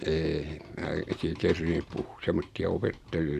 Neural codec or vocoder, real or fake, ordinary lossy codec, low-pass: none; real; Opus, 64 kbps; 14.4 kHz